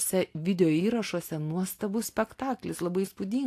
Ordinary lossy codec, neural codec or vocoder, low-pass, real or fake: AAC, 64 kbps; none; 14.4 kHz; real